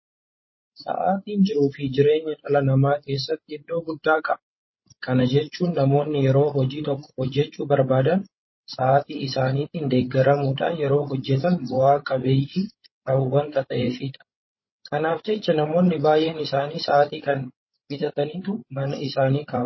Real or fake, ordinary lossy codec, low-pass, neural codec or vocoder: fake; MP3, 24 kbps; 7.2 kHz; codec, 16 kHz, 16 kbps, FreqCodec, larger model